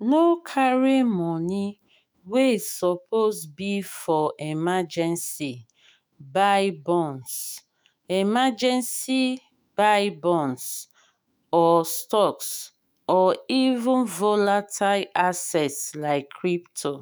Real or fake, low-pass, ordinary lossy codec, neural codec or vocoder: fake; none; none; autoencoder, 48 kHz, 128 numbers a frame, DAC-VAE, trained on Japanese speech